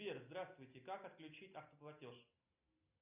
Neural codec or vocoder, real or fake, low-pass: none; real; 3.6 kHz